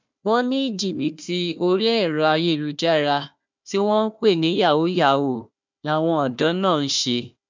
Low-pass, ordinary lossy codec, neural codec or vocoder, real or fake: 7.2 kHz; MP3, 64 kbps; codec, 16 kHz, 1 kbps, FunCodec, trained on Chinese and English, 50 frames a second; fake